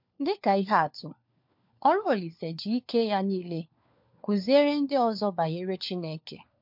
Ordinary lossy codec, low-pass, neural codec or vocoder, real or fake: MP3, 48 kbps; 5.4 kHz; codec, 16 kHz, 4 kbps, FunCodec, trained on LibriTTS, 50 frames a second; fake